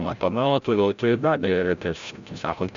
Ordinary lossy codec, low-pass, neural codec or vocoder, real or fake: MP3, 64 kbps; 7.2 kHz; codec, 16 kHz, 0.5 kbps, FreqCodec, larger model; fake